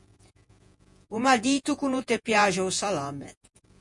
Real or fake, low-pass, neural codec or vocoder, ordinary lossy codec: fake; 10.8 kHz; vocoder, 48 kHz, 128 mel bands, Vocos; MP3, 64 kbps